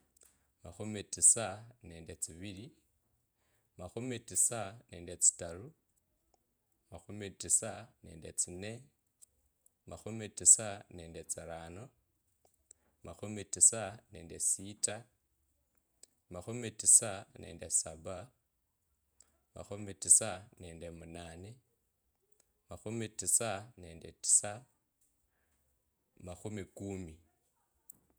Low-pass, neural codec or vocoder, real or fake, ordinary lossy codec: none; vocoder, 48 kHz, 128 mel bands, Vocos; fake; none